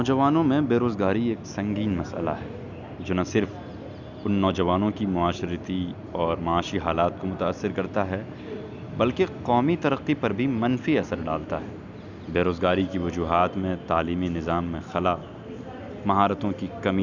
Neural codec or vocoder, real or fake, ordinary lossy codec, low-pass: none; real; none; 7.2 kHz